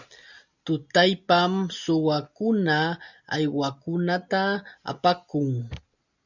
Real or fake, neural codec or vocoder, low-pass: real; none; 7.2 kHz